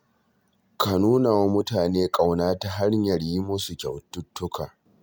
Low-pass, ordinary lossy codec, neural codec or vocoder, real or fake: none; none; none; real